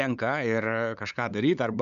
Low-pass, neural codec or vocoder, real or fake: 7.2 kHz; codec, 16 kHz, 8 kbps, FunCodec, trained on LibriTTS, 25 frames a second; fake